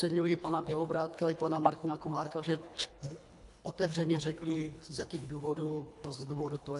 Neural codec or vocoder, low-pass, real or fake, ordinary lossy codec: codec, 24 kHz, 1.5 kbps, HILCodec; 10.8 kHz; fake; AAC, 64 kbps